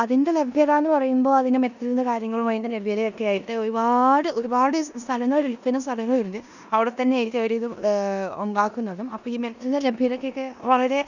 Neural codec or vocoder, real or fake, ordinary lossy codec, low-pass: codec, 16 kHz in and 24 kHz out, 0.9 kbps, LongCat-Audio-Codec, four codebook decoder; fake; none; 7.2 kHz